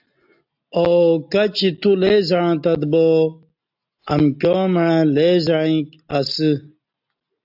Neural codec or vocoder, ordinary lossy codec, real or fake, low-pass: none; AAC, 48 kbps; real; 5.4 kHz